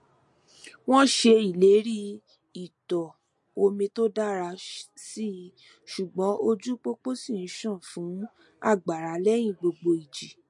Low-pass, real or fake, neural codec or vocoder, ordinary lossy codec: 10.8 kHz; real; none; MP3, 48 kbps